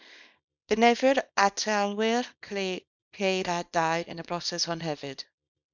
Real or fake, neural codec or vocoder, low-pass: fake; codec, 24 kHz, 0.9 kbps, WavTokenizer, small release; 7.2 kHz